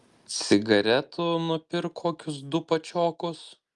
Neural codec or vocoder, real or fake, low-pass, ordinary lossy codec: none; real; 10.8 kHz; Opus, 32 kbps